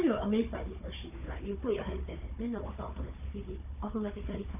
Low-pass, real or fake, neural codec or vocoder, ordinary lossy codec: 3.6 kHz; fake; codec, 16 kHz, 4 kbps, FunCodec, trained on Chinese and English, 50 frames a second; none